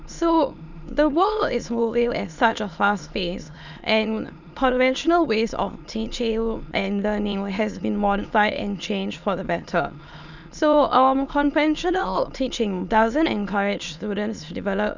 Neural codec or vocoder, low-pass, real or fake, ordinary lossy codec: autoencoder, 22.05 kHz, a latent of 192 numbers a frame, VITS, trained on many speakers; 7.2 kHz; fake; none